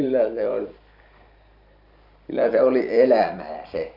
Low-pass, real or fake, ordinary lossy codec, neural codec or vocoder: 5.4 kHz; fake; none; vocoder, 44.1 kHz, 128 mel bands every 512 samples, BigVGAN v2